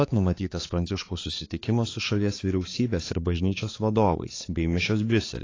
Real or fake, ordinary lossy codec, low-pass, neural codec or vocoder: fake; AAC, 32 kbps; 7.2 kHz; codec, 16 kHz, 4 kbps, X-Codec, HuBERT features, trained on balanced general audio